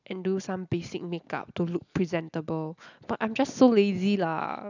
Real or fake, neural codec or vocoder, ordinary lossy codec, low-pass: real; none; none; 7.2 kHz